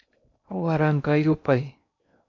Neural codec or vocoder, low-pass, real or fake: codec, 16 kHz in and 24 kHz out, 0.8 kbps, FocalCodec, streaming, 65536 codes; 7.2 kHz; fake